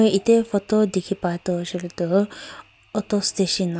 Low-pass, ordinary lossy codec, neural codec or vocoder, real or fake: none; none; none; real